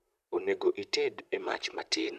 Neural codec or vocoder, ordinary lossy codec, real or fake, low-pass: vocoder, 44.1 kHz, 128 mel bands, Pupu-Vocoder; none; fake; 14.4 kHz